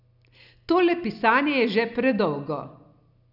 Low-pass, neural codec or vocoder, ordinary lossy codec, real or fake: 5.4 kHz; none; none; real